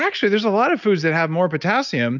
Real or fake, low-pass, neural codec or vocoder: real; 7.2 kHz; none